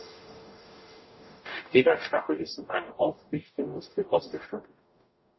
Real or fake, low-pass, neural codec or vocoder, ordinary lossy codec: fake; 7.2 kHz; codec, 44.1 kHz, 0.9 kbps, DAC; MP3, 24 kbps